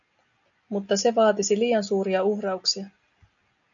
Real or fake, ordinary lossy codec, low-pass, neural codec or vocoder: real; MP3, 96 kbps; 7.2 kHz; none